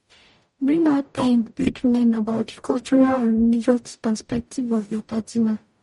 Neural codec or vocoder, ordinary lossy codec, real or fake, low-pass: codec, 44.1 kHz, 0.9 kbps, DAC; MP3, 48 kbps; fake; 19.8 kHz